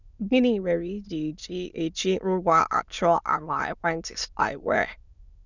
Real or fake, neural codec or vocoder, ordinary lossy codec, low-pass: fake; autoencoder, 22.05 kHz, a latent of 192 numbers a frame, VITS, trained on many speakers; none; 7.2 kHz